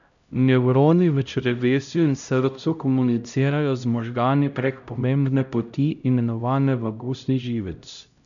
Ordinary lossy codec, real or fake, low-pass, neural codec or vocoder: none; fake; 7.2 kHz; codec, 16 kHz, 0.5 kbps, X-Codec, HuBERT features, trained on LibriSpeech